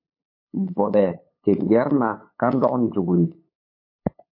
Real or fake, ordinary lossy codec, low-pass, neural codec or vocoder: fake; MP3, 32 kbps; 5.4 kHz; codec, 16 kHz, 8 kbps, FunCodec, trained on LibriTTS, 25 frames a second